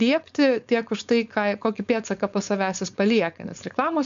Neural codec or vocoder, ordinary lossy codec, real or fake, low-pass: codec, 16 kHz, 4.8 kbps, FACodec; MP3, 64 kbps; fake; 7.2 kHz